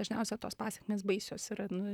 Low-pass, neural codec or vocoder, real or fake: 19.8 kHz; vocoder, 44.1 kHz, 128 mel bands, Pupu-Vocoder; fake